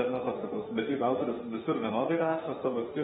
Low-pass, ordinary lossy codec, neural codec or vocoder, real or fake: 19.8 kHz; AAC, 16 kbps; autoencoder, 48 kHz, 32 numbers a frame, DAC-VAE, trained on Japanese speech; fake